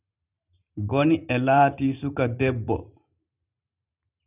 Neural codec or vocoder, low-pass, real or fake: vocoder, 44.1 kHz, 128 mel bands every 512 samples, BigVGAN v2; 3.6 kHz; fake